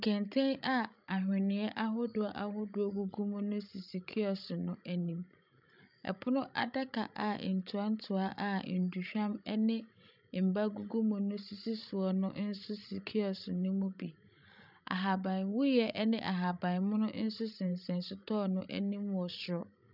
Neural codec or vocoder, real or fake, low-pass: codec, 16 kHz, 16 kbps, FreqCodec, larger model; fake; 5.4 kHz